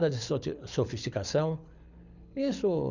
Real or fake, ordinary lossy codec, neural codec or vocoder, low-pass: fake; none; codec, 24 kHz, 6 kbps, HILCodec; 7.2 kHz